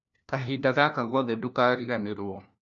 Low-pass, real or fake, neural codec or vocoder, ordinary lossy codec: 7.2 kHz; fake; codec, 16 kHz, 1 kbps, FunCodec, trained on LibriTTS, 50 frames a second; none